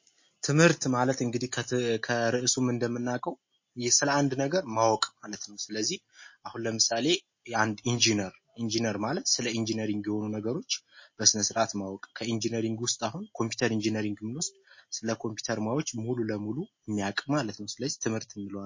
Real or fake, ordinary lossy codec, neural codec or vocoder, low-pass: real; MP3, 32 kbps; none; 7.2 kHz